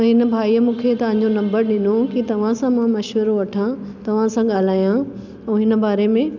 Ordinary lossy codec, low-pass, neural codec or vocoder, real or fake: none; 7.2 kHz; none; real